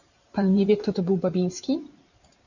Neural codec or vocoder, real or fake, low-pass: vocoder, 44.1 kHz, 128 mel bands every 512 samples, BigVGAN v2; fake; 7.2 kHz